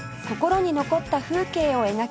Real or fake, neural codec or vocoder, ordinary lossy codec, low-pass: real; none; none; none